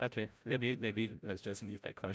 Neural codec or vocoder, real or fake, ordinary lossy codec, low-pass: codec, 16 kHz, 0.5 kbps, FreqCodec, larger model; fake; none; none